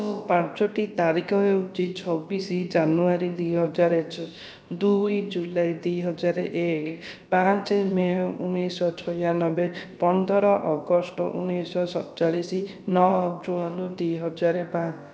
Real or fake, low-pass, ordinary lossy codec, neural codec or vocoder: fake; none; none; codec, 16 kHz, about 1 kbps, DyCAST, with the encoder's durations